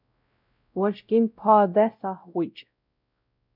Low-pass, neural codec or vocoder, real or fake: 5.4 kHz; codec, 16 kHz, 0.5 kbps, X-Codec, WavLM features, trained on Multilingual LibriSpeech; fake